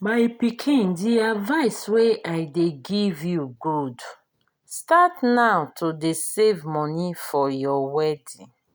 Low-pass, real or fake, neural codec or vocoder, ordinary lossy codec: none; real; none; none